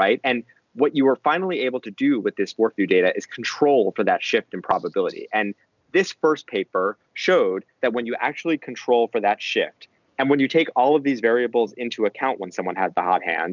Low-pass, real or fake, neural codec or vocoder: 7.2 kHz; real; none